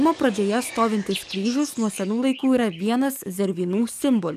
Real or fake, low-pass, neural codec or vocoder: fake; 14.4 kHz; codec, 44.1 kHz, 7.8 kbps, DAC